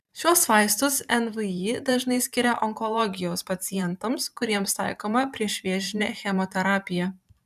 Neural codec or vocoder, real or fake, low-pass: vocoder, 44.1 kHz, 128 mel bands every 512 samples, BigVGAN v2; fake; 14.4 kHz